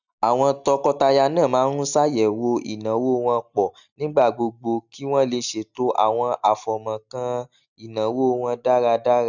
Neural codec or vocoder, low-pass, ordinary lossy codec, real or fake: none; 7.2 kHz; none; real